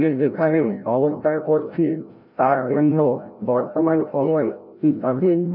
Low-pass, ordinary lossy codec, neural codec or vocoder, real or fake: 5.4 kHz; none; codec, 16 kHz, 0.5 kbps, FreqCodec, larger model; fake